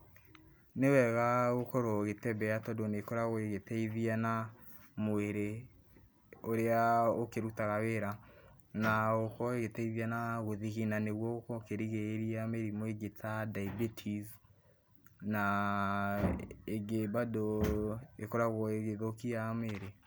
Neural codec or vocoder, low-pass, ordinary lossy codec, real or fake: none; none; none; real